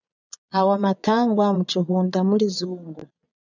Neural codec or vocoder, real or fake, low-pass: vocoder, 22.05 kHz, 80 mel bands, Vocos; fake; 7.2 kHz